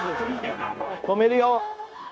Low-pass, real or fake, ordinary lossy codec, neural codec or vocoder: none; fake; none; codec, 16 kHz, 0.9 kbps, LongCat-Audio-Codec